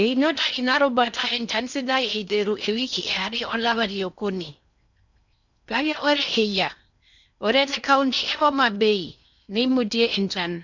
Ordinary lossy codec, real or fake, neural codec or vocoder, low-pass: none; fake; codec, 16 kHz in and 24 kHz out, 0.6 kbps, FocalCodec, streaming, 2048 codes; 7.2 kHz